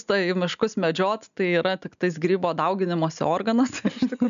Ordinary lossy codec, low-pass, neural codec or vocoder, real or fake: MP3, 96 kbps; 7.2 kHz; none; real